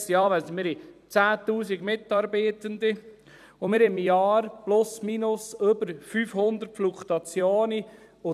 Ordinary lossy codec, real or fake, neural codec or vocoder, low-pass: none; fake; vocoder, 44.1 kHz, 128 mel bands every 256 samples, BigVGAN v2; 14.4 kHz